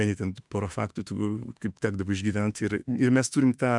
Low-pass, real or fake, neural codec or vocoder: 10.8 kHz; fake; autoencoder, 48 kHz, 32 numbers a frame, DAC-VAE, trained on Japanese speech